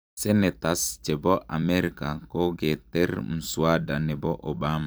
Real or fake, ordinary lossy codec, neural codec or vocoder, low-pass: fake; none; vocoder, 44.1 kHz, 128 mel bands every 256 samples, BigVGAN v2; none